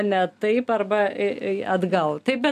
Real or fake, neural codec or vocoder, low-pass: real; none; 14.4 kHz